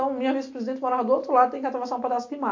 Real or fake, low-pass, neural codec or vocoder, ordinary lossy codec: real; 7.2 kHz; none; AAC, 48 kbps